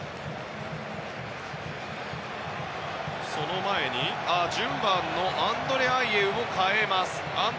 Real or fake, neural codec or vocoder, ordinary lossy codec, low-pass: real; none; none; none